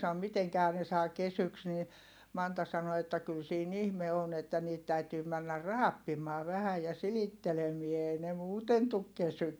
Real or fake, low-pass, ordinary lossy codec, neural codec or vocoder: real; none; none; none